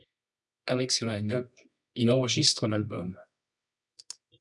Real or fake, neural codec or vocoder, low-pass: fake; codec, 24 kHz, 0.9 kbps, WavTokenizer, medium music audio release; 10.8 kHz